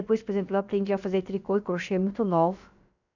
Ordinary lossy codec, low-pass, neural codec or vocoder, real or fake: none; 7.2 kHz; codec, 16 kHz, about 1 kbps, DyCAST, with the encoder's durations; fake